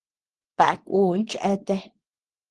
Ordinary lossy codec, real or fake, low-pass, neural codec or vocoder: Opus, 16 kbps; fake; 10.8 kHz; codec, 24 kHz, 0.9 kbps, WavTokenizer, small release